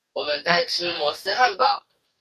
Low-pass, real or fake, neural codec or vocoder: 14.4 kHz; fake; codec, 44.1 kHz, 2.6 kbps, DAC